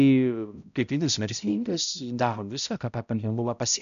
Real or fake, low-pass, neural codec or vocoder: fake; 7.2 kHz; codec, 16 kHz, 0.5 kbps, X-Codec, HuBERT features, trained on balanced general audio